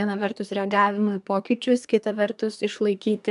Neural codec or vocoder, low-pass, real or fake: codec, 24 kHz, 1 kbps, SNAC; 10.8 kHz; fake